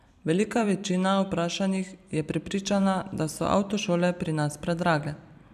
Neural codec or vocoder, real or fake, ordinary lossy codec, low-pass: vocoder, 44.1 kHz, 128 mel bands every 256 samples, BigVGAN v2; fake; none; 14.4 kHz